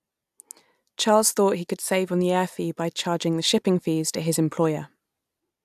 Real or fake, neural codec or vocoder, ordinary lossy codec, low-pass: real; none; AAC, 96 kbps; 14.4 kHz